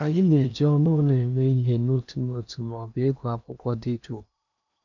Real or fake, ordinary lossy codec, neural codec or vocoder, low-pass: fake; none; codec, 16 kHz in and 24 kHz out, 0.8 kbps, FocalCodec, streaming, 65536 codes; 7.2 kHz